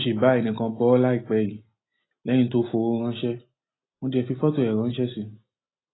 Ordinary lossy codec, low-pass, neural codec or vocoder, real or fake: AAC, 16 kbps; 7.2 kHz; none; real